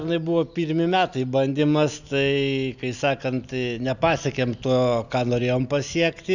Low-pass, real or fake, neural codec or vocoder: 7.2 kHz; real; none